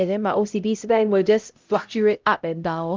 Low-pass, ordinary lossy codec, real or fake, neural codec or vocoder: 7.2 kHz; Opus, 24 kbps; fake; codec, 16 kHz, 0.5 kbps, X-Codec, HuBERT features, trained on LibriSpeech